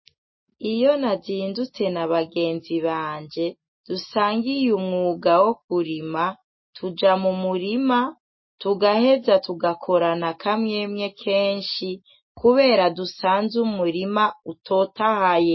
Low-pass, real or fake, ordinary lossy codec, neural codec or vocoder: 7.2 kHz; real; MP3, 24 kbps; none